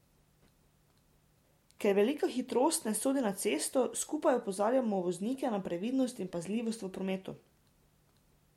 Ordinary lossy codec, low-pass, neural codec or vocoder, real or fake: MP3, 64 kbps; 19.8 kHz; none; real